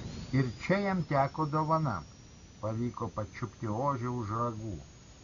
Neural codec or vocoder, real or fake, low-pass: none; real; 7.2 kHz